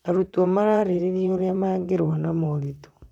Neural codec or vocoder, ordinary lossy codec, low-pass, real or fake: vocoder, 44.1 kHz, 128 mel bands, Pupu-Vocoder; none; 19.8 kHz; fake